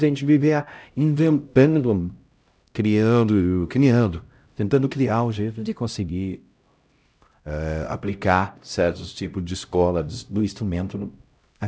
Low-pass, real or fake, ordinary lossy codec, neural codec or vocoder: none; fake; none; codec, 16 kHz, 0.5 kbps, X-Codec, HuBERT features, trained on LibriSpeech